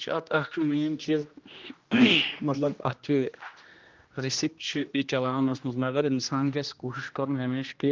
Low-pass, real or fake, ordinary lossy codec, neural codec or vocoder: 7.2 kHz; fake; Opus, 24 kbps; codec, 16 kHz, 1 kbps, X-Codec, HuBERT features, trained on general audio